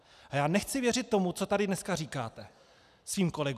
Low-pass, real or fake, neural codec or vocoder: 14.4 kHz; real; none